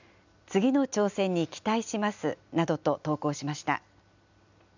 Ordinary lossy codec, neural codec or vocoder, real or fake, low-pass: none; none; real; 7.2 kHz